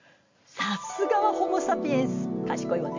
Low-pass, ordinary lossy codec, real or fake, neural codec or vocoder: 7.2 kHz; MP3, 48 kbps; real; none